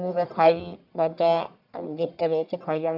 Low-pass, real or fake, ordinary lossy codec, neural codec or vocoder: 5.4 kHz; fake; none; codec, 44.1 kHz, 1.7 kbps, Pupu-Codec